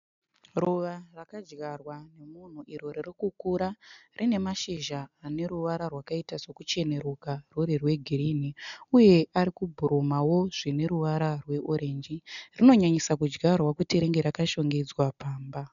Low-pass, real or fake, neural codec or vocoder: 7.2 kHz; real; none